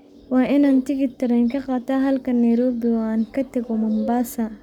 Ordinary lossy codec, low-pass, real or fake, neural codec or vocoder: none; 19.8 kHz; fake; codec, 44.1 kHz, 7.8 kbps, Pupu-Codec